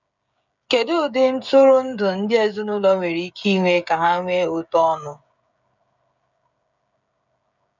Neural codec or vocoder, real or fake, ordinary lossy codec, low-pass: codec, 16 kHz, 16 kbps, FreqCodec, smaller model; fake; none; 7.2 kHz